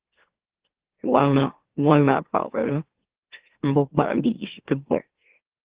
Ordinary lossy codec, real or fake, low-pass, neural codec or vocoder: Opus, 16 kbps; fake; 3.6 kHz; autoencoder, 44.1 kHz, a latent of 192 numbers a frame, MeloTTS